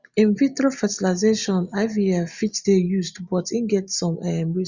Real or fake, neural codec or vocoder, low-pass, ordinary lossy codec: real; none; 7.2 kHz; Opus, 64 kbps